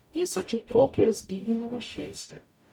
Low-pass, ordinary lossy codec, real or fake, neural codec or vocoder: 19.8 kHz; none; fake; codec, 44.1 kHz, 0.9 kbps, DAC